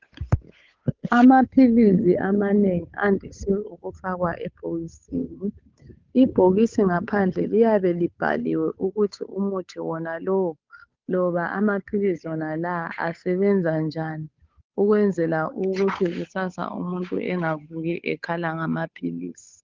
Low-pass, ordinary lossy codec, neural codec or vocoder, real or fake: 7.2 kHz; Opus, 24 kbps; codec, 16 kHz, 8 kbps, FunCodec, trained on Chinese and English, 25 frames a second; fake